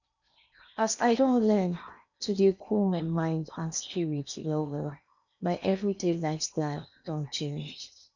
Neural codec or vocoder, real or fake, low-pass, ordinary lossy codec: codec, 16 kHz in and 24 kHz out, 0.8 kbps, FocalCodec, streaming, 65536 codes; fake; 7.2 kHz; none